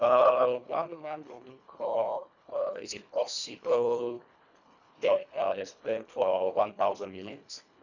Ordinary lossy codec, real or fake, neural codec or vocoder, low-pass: none; fake; codec, 24 kHz, 1.5 kbps, HILCodec; 7.2 kHz